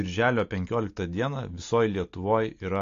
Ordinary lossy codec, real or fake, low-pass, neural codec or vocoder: AAC, 48 kbps; real; 7.2 kHz; none